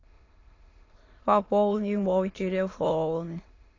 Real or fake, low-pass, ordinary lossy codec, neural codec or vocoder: fake; 7.2 kHz; AAC, 32 kbps; autoencoder, 22.05 kHz, a latent of 192 numbers a frame, VITS, trained on many speakers